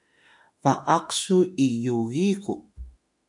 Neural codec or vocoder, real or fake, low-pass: autoencoder, 48 kHz, 32 numbers a frame, DAC-VAE, trained on Japanese speech; fake; 10.8 kHz